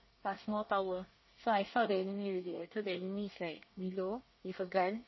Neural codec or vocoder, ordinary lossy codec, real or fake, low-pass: codec, 24 kHz, 1 kbps, SNAC; MP3, 24 kbps; fake; 7.2 kHz